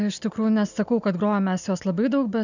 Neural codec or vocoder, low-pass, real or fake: none; 7.2 kHz; real